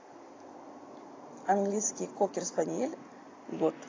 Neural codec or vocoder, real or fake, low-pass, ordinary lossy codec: none; real; 7.2 kHz; AAC, 32 kbps